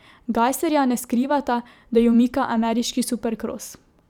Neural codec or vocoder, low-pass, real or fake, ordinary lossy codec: vocoder, 44.1 kHz, 128 mel bands every 512 samples, BigVGAN v2; 19.8 kHz; fake; none